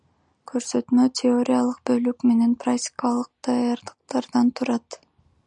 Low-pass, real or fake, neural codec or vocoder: 10.8 kHz; real; none